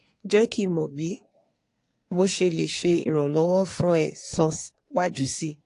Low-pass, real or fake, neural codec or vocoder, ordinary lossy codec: 10.8 kHz; fake; codec, 24 kHz, 1 kbps, SNAC; AAC, 48 kbps